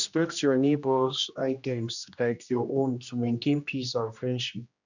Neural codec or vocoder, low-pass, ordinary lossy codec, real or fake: codec, 16 kHz, 1 kbps, X-Codec, HuBERT features, trained on general audio; 7.2 kHz; none; fake